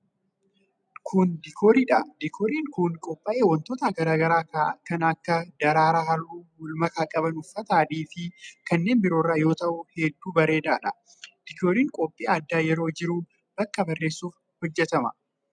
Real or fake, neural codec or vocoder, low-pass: real; none; 9.9 kHz